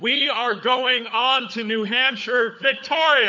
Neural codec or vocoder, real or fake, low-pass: codec, 16 kHz, 4 kbps, FunCodec, trained on LibriTTS, 50 frames a second; fake; 7.2 kHz